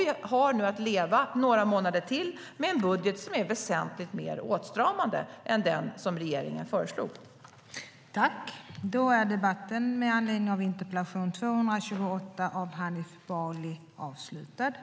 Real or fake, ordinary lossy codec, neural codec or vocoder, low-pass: real; none; none; none